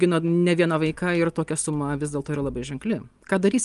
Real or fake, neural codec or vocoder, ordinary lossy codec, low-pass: real; none; Opus, 32 kbps; 10.8 kHz